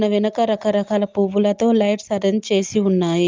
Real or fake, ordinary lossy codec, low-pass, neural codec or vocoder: real; Opus, 32 kbps; 7.2 kHz; none